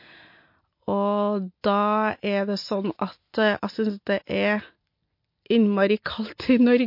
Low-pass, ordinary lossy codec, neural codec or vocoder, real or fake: 5.4 kHz; MP3, 32 kbps; none; real